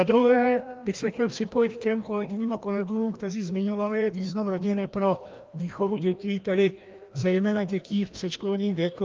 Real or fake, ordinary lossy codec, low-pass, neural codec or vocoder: fake; Opus, 24 kbps; 7.2 kHz; codec, 16 kHz, 1 kbps, FreqCodec, larger model